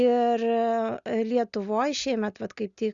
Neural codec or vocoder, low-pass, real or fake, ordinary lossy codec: none; 7.2 kHz; real; Opus, 64 kbps